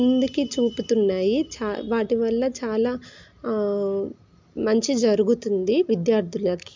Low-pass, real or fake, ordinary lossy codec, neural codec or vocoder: 7.2 kHz; real; MP3, 64 kbps; none